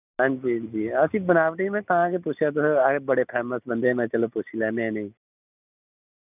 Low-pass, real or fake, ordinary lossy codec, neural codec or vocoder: 3.6 kHz; real; none; none